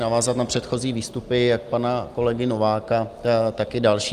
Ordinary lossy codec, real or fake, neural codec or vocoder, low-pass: Opus, 32 kbps; real; none; 14.4 kHz